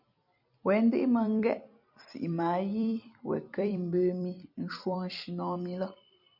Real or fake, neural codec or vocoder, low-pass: fake; vocoder, 44.1 kHz, 128 mel bands every 256 samples, BigVGAN v2; 5.4 kHz